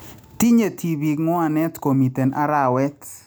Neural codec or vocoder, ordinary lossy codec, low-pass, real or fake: none; none; none; real